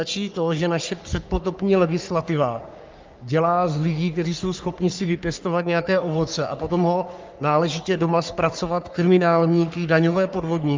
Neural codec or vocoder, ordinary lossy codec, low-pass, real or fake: codec, 44.1 kHz, 3.4 kbps, Pupu-Codec; Opus, 24 kbps; 7.2 kHz; fake